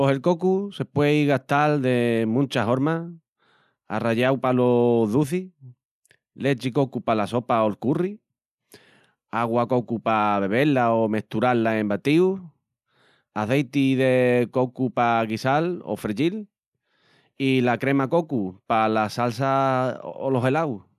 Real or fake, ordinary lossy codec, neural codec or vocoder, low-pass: real; none; none; 14.4 kHz